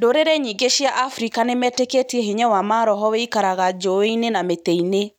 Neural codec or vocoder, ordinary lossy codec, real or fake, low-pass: none; none; real; 19.8 kHz